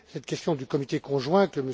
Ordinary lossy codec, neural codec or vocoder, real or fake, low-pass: none; none; real; none